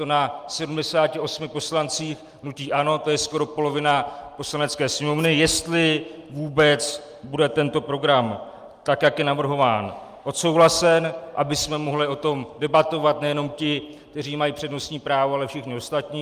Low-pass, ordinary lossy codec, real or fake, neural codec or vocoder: 14.4 kHz; Opus, 32 kbps; real; none